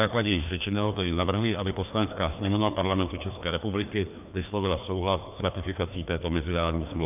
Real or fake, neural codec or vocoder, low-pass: fake; codec, 16 kHz, 2 kbps, FreqCodec, larger model; 3.6 kHz